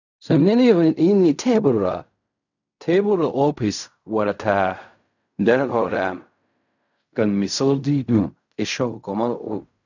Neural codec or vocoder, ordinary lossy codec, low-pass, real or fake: codec, 16 kHz in and 24 kHz out, 0.4 kbps, LongCat-Audio-Codec, fine tuned four codebook decoder; none; 7.2 kHz; fake